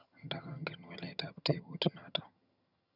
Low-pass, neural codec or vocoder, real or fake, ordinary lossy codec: 5.4 kHz; vocoder, 22.05 kHz, 80 mel bands, HiFi-GAN; fake; none